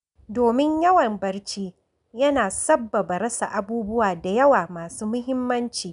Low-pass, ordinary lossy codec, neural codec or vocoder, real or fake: 10.8 kHz; none; none; real